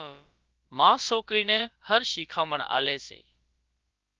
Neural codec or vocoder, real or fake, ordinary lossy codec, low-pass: codec, 16 kHz, about 1 kbps, DyCAST, with the encoder's durations; fake; Opus, 32 kbps; 7.2 kHz